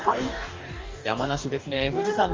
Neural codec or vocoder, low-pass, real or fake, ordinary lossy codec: codec, 44.1 kHz, 2.6 kbps, DAC; 7.2 kHz; fake; Opus, 32 kbps